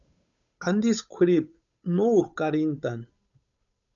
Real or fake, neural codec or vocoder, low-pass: fake; codec, 16 kHz, 8 kbps, FunCodec, trained on Chinese and English, 25 frames a second; 7.2 kHz